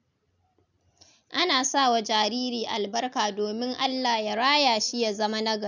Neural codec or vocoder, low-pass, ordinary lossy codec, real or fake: none; 7.2 kHz; none; real